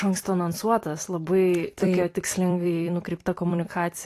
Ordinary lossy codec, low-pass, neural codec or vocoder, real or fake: AAC, 48 kbps; 14.4 kHz; vocoder, 44.1 kHz, 128 mel bands every 256 samples, BigVGAN v2; fake